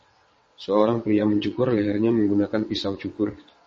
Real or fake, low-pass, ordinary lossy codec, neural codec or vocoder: fake; 9.9 kHz; MP3, 32 kbps; vocoder, 22.05 kHz, 80 mel bands, WaveNeXt